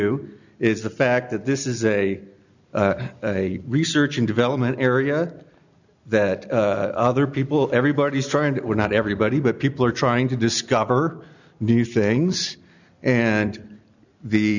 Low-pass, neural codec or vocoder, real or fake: 7.2 kHz; none; real